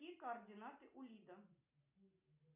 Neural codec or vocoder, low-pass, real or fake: none; 3.6 kHz; real